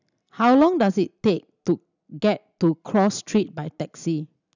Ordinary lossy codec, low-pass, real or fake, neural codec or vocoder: none; 7.2 kHz; real; none